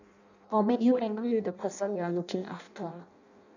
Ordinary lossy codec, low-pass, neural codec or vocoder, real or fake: none; 7.2 kHz; codec, 16 kHz in and 24 kHz out, 0.6 kbps, FireRedTTS-2 codec; fake